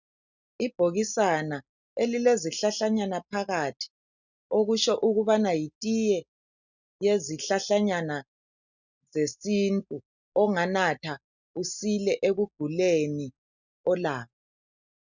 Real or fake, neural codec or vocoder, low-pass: real; none; 7.2 kHz